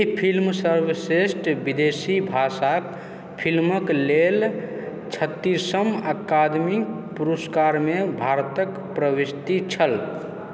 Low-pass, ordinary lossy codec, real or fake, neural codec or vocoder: none; none; real; none